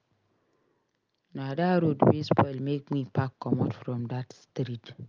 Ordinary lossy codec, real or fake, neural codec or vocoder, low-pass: Opus, 24 kbps; real; none; 7.2 kHz